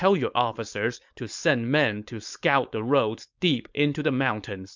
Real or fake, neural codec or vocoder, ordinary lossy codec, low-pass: fake; codec, 16 kHz, 4.8 kbps, FACodec; MP3, 64 kbps; 7.2 kHz